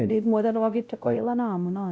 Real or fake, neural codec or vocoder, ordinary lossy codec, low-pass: fake; codec, 16 kHz, 0.5 kbps, X-Codec, WavLM features, trained on Multilingual LibriSpeech; none; none